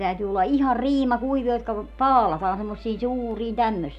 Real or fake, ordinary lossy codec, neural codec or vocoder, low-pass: real; none; none; 14.4 kHz